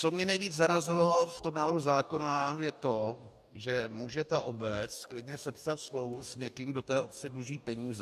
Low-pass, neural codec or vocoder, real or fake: 14.4 kHz; codec, 44.1 kHz, 2.6 kbps, DAC; fake